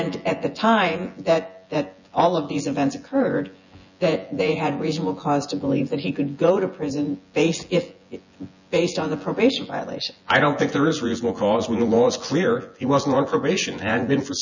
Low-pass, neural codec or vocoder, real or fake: 7.2 kHz; vocoder, 24 kHz, 100 mel bands, Vocos; fake